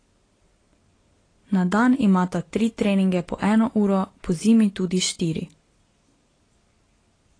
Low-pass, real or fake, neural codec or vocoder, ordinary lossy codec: 9.9 kHz; real; none; AAC, 32 kbps